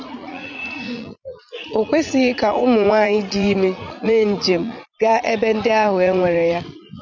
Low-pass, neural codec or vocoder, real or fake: 7.2 kHz; vocoder, 44.1 kHz, 80 mel bands, Vocos; fake